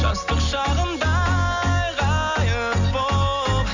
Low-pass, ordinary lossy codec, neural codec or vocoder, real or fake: 7.2 kHz; none; none; real